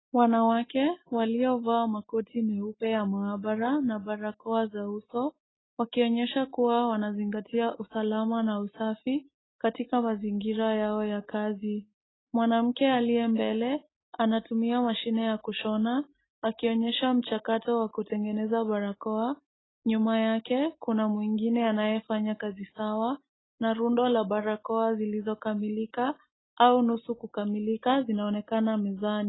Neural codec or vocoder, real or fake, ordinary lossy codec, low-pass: none; real; AAC, 16 kbps; 7.2 kHz